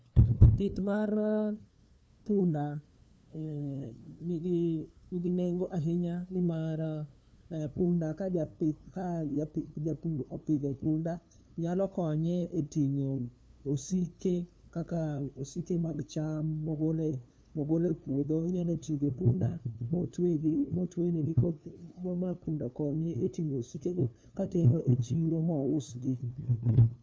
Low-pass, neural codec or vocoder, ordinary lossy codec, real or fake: none; codec, 16 kHz, 2 kbps, FunCodec, trained on LibriTTS, 25 frames a second; none; fake